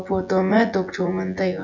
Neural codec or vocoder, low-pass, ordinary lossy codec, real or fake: vocoder, 24 kHz, 100 mel bands, Vocos; 7.2 kHz; none; fake